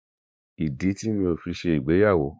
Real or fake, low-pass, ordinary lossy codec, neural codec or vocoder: fake; none; none; codec, 16 kHz, 4 kbps, X-Codec, WavLM features, trained on Multilingual LibriSpeech